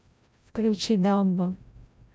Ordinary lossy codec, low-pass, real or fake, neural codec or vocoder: none; none; fake; codec, 16 kHz, 0.5 kbps, FreqCodec, larger model